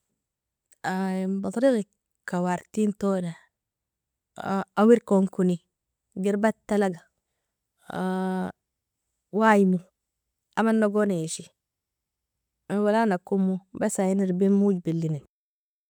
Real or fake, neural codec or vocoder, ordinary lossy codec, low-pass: real; none; none; 19.8 kHz